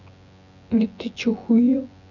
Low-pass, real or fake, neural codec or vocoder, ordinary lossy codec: 7.2 kHz; fake; vocoder, 24 kHz, 100 mel bands, Vocos; none